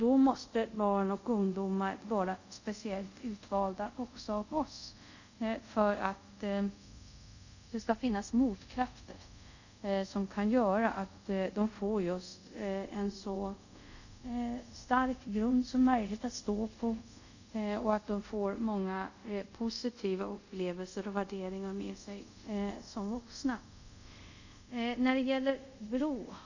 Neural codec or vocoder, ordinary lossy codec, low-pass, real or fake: codec, 24 kHz, 0.5 kbps, DualCodec; none; 7.2 kHz; fake